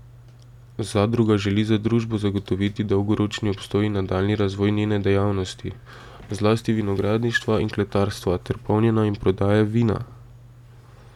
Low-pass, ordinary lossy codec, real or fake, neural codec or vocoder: 19.8 kHz; none; real; none